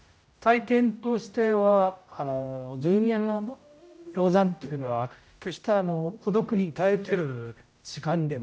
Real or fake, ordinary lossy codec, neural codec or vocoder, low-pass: fake; none; codec, 16 kHz, 0.5 kbps, X-Codec, HuBERT features, trained on general audio; none